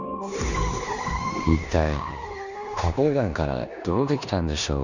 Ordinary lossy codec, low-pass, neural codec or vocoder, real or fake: none; 7.2 kHz; codec, 16 kHz in and 24 kHz out, 0.9 kbps, LongCat-Audio-Codec, four codebook decoder; fake